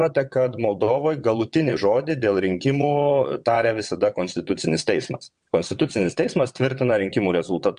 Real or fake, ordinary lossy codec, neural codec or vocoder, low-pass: fake; MP3, 64 kbps; vocoder, 22.05 kHz, 80 mel bands, WaveNeXt; 9.9 kHz